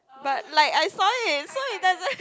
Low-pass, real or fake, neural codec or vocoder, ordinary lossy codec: none; real; none; none